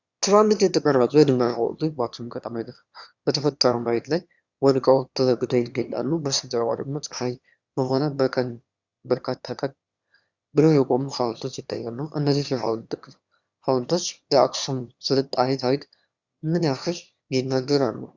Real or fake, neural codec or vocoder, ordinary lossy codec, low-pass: fake; autoencoder, 22.05 kHz, a latent of 192 numbers a frame, VITS, trained on one speaker; Opus, 64 kbps; 7.2 kHz